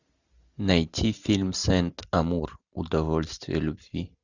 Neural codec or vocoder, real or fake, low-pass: none; real; 7.2 kHz